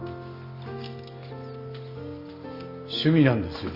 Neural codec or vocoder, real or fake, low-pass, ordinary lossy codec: none; real; 5.4 kHz; Opus, 64 kbps